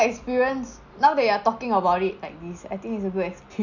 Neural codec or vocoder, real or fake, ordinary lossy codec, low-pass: none; real; none; 7.2 kHz